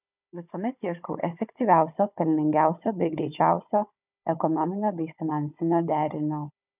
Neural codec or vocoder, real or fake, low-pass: codec, 16 kHz, 16 kbps, FunCodec, trained on Chinese and English, 50 frames a second; fake; 3.6 kHz